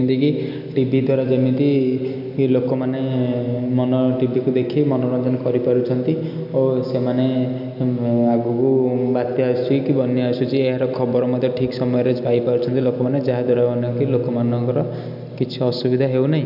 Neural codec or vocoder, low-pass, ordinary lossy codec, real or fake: none; 5.4 kHz; none; real